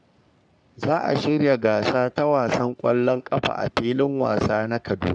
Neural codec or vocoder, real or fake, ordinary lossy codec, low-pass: codec, 44.1 kHz, 3.4 kbps, Pupu-Codec; fake; none; 9.9 kHz